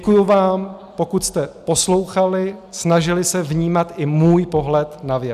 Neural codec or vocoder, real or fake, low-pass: none; real; 14.4 kHz